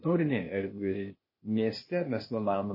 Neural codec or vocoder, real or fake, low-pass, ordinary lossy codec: codec, 16 kHz, 0.8 kbps, ZipCodec; fake; 5.4 kHz; MP3, 24 kbps